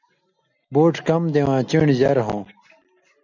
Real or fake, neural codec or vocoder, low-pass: real; none; 7.2 kHz